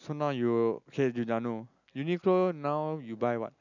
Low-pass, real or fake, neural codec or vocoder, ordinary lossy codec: 7.2 kHz; real; none; none